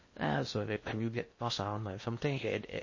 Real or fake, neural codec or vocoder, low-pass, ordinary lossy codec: fake; codec, 16 kHz in and 24 kHz out, 0.6 kbps, FocalCodec, streaming, 2048 codes; 7.2 kHz; MP3, 32 kbps